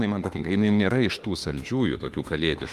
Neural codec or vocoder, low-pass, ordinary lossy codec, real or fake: autoencoder, 48 kHz, 32 numbers a frame, DAC-VAE, trained on Japanese speech; 14.4 kHz; Opus, 32 kbps; fake